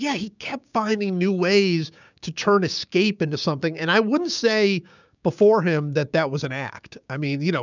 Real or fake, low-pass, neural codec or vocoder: fake; 7.2 kHz; codec, 16 kHz, 6 kbps, DAC